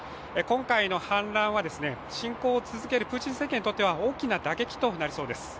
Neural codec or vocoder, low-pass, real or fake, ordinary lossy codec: none; none; real; none